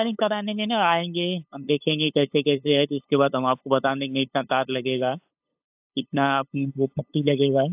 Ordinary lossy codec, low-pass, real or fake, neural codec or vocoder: AAC, 32 kbps; 3.6 kHz; fake; codec, 16 kHz, 8 kbps, FunCodec, trained on LibriTTS, 25 frames a second